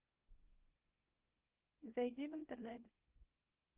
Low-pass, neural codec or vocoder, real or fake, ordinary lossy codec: 3.6 kHz; codec, 24 kHz, 0.9 kbps, WavTokenizer, small release; fake; Opus, 16 kbps